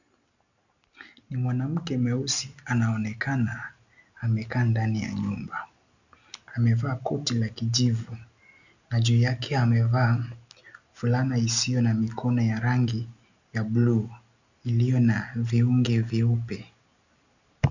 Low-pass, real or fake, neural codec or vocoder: 7.2 kHz; real; none